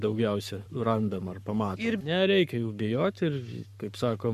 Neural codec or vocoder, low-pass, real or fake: codec, 44.1 kHz, 7.8 kbps, Pupu-Codec; 14.4 kHz; fake